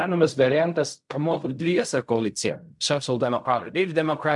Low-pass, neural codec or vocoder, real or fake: 10.8 kHz; codec, 16 kHz in and 24 kHz out, 0.4 kbps, LongCat-Audio-Codec, fine tuned four codebook decoder; fake